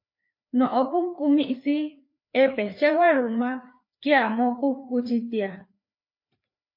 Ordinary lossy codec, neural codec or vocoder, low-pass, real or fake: MP3, 32 kbps; codec, 16 kHz, 2 kbps, FreqCodec, larger model; 5.4 kHz; fake